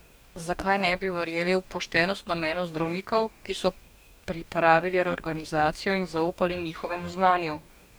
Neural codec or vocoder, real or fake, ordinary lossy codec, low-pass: codec, 44.1 kHz, 2.6 kbps, DAC; fake; none; none